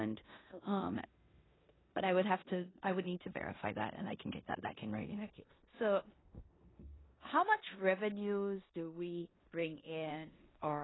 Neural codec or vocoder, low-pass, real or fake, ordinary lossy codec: codec, 16 kHz in and 24 kHz out, 0.9 kbps, LongCat-Audio-Codec, four codebook decoder; 7.2 kHz; fake; AAC, 16 kbps